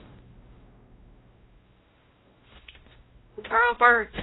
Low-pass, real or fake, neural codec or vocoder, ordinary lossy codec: 7.2 kHz; fake; codec, 16 kHz, 0.5 kbps, X-Codec, WavLM features, trained on Multilingual LibriSpeech; AAC, 16 kbps